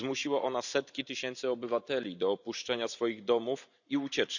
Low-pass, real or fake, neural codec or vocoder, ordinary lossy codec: 7.2 kHz; fake; vocoder, 44.1 kHz, 128 mel bands every 512 samples, BigVGAN v2; none